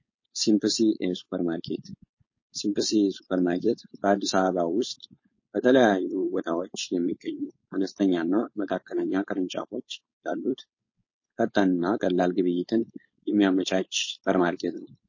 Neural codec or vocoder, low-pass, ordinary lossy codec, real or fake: codec, 16 kHz, 4.8 kbps, FACodec; 7.2 kHz; MP3, 32 kbps; fake